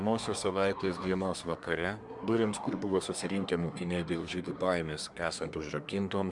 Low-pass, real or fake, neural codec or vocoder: 10.8 kHz; fake; codec, 24 kHz, 1 kbps, SNAC